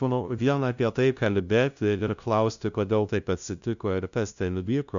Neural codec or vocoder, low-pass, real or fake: codec, 16 kHz, 0.5 kbps, FunCodec, trained on LibriTTS, 25 frames a second; 7.2 kHz; fake